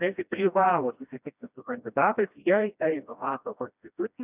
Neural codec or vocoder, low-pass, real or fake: codec, 16 kHz, 1 kbps, FreqCodec, smaller model; 3.6 kHz; fake